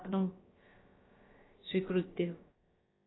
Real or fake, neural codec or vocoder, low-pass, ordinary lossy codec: fake; codec, 16 kHz, about 1 kbps, DyCAST, with the encoder's durations; 7.2 kHz; AAC, 16 kbps